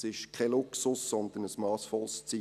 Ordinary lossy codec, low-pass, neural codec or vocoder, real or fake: none; 14.4 kHz; none; real